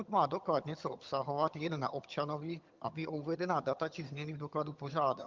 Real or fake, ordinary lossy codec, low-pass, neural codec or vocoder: fake; Opus, 24 kbps; 7.2 kHz; vocoder, 22.05 kHz, 80 mel bands, HiFi-GAN